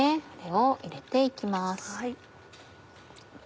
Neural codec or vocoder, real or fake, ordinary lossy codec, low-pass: none; real; none; none